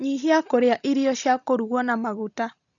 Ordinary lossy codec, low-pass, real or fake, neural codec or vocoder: none; 7.2 kHz; real; none